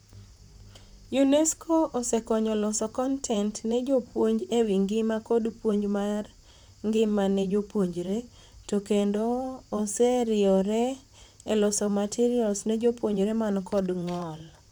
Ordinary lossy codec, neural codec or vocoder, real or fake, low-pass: none; vocoder, 44.1 kHz, 128 mel bands, Pupu-Vocoder; fake; none